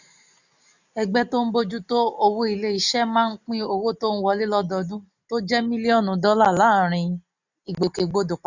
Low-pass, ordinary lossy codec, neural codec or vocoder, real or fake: 7.2 kHz; none; none; real